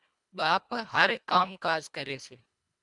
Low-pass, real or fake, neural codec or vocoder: 10.8 kHz; fake; codec, 24 kHz, 1.5 kbps, HILCodec